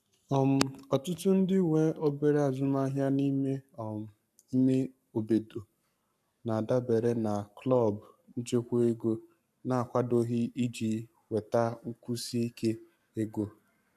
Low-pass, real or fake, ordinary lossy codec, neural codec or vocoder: 14.4 kHz; fake; none; codec, 44.1 kHz, 7.8 kbps, Pupu-Codec